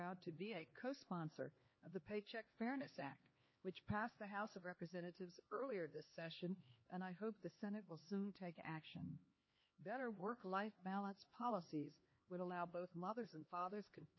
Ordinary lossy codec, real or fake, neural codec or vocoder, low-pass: MP3, 24 kbps; fake; codec, 16 kHz, 2 kbps, X-Codec, HuBERT features, trained on LibriSpeech; 7.2 kHz